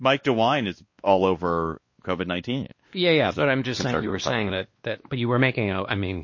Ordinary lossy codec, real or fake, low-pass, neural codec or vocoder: MP3, 32 kbps; fake; 7.2 kHz; codec, 16 kHz, 2 kbps, X-Codec, WavLM features, trained on Multilingual LibriSpeech